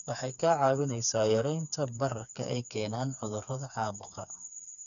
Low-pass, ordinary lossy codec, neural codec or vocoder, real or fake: 7.2 kHz; none; codec, 16 kHz, 4 kbps, FreqCodec, smaller model; fake